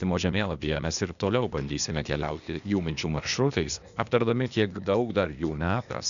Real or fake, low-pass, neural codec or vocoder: fake; 7.2 kHz; codec, 16 kHz, 0.8 kbps, ZipCodec